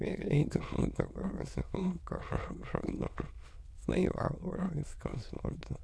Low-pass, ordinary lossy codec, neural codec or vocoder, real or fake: none; none; autoencoder, 22.05 kHz, a latent of 192 numbers a frame, VITS, trained on many speakers; fake